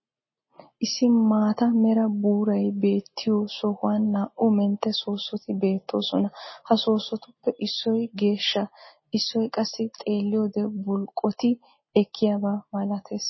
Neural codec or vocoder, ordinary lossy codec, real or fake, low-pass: none; MP3, 24 kbps; real; 7.2 kHz